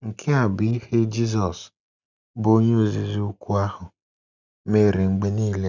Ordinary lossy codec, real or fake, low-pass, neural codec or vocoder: none; real; 7.2 kHz; none